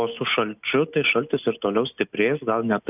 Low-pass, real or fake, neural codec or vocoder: 3.6 kHz; real; none